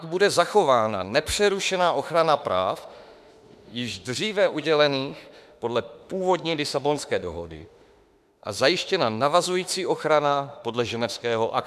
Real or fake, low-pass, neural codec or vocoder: fake; 14.4 kHz; autoencoder, 48 kHz, 32 numbers a frame, DAC-VAE, trained on Japanese speech